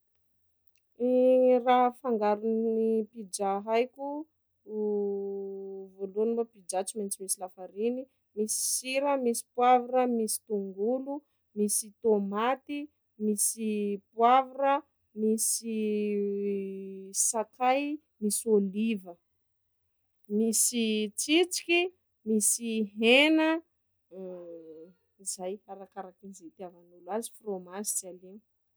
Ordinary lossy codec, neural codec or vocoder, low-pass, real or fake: none; none; none; real